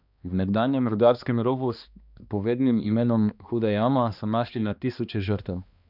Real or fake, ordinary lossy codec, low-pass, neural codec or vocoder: fake; none; 5.4 kHz; codec, 16 kHz, 2 kbps, X-Codec, HuBERT features, trained on balanced general audio